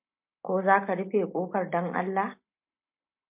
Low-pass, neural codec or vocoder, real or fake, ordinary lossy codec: 3.6 kHz; none; real; MP3, 32 kbps